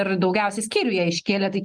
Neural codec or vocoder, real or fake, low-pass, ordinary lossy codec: none; real; 9.9 kHz; Opus, 24 kbps